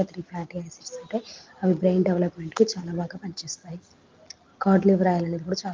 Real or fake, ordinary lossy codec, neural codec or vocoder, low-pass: real; Opus, 32 kbps; none; 7.2 kHz